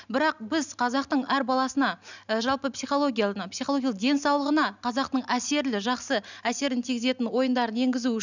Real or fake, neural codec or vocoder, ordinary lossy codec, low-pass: real; none; none; 7.2 kHz